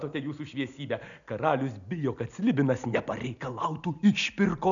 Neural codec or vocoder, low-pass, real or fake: none; 7.2 kHz; real